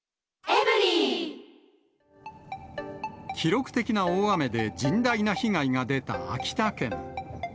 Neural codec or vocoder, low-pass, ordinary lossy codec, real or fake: none; none; none; real